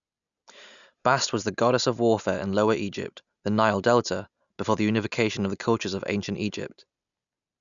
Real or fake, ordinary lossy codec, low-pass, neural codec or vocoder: real; none; 7.2 kHz; none